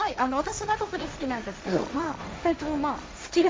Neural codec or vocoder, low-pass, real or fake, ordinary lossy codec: codec, 16 kHz, 1.1 kbps, Voila-Tokenizer; none; fake; none